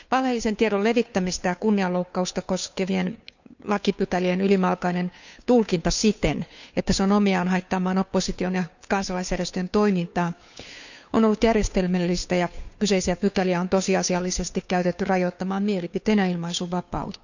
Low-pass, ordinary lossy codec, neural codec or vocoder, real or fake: 7.2 kHz; none; codec, 16 kHz, 2 kbps, FunCodec, trained on Chinese and English, 25 frames a second; fake